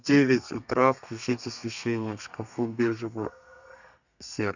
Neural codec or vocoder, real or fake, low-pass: codec, 32 kHz, 1.9 kbps, SNAC; fake; 7.2 kHz